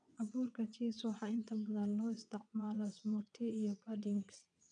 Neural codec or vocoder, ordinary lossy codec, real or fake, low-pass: vocoder, 22.05 kHz, 80 mel bands, WaveNeXt; none; fake; 9.9 kHz